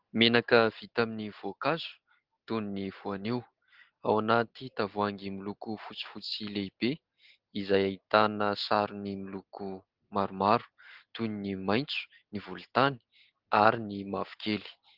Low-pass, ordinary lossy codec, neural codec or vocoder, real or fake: 5.4 kHz; Opus, 16 kbps; none; real